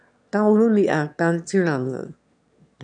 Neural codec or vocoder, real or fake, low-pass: autoencoder, 22.05 kHz, a latent of 192 numbers a frame, VITS, trained on one speaker; fake; 9.9 kHz